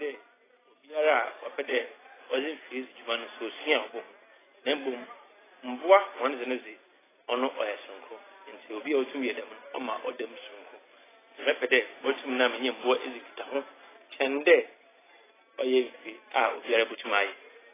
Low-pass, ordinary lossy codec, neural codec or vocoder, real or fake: 3.6 kHz; AAC, 16 kbps; none; real